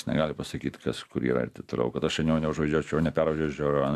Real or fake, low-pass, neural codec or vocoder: real; 14.4 kHz; none